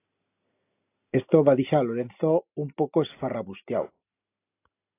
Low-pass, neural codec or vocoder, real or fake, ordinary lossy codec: 3.6 kHz; none; real; AAC, 24 kbps